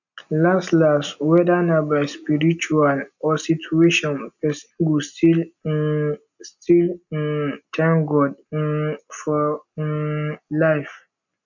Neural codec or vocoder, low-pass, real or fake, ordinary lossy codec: none; 7.2 kHz; real; none